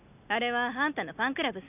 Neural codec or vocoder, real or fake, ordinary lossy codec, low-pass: none; real; none; 3.6 kHz